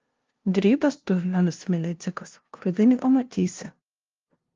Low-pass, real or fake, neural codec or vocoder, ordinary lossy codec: 7.2 kHz; fake; codec, 16 kHz, 0.5 kbps, FunCodec, trained on LibriTTS, 25 frames a second; Opus, 32 kbps